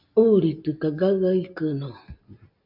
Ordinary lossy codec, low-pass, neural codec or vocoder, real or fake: MP3, 48 kbps; 5.4 kHz; none; real